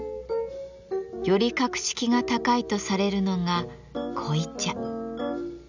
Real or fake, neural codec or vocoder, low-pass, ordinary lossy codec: real; none; 7.2 kHz; none